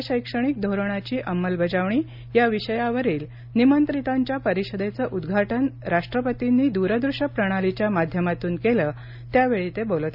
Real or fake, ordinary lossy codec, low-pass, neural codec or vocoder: real; none; 5.4 kHz; none